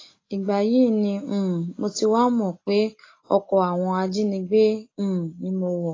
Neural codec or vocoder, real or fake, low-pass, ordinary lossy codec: none; real; 7.2 kHz; AAC, 32 kbps